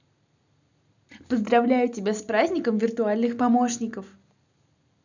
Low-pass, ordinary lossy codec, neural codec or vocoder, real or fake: 7.2 kHz; none; none; real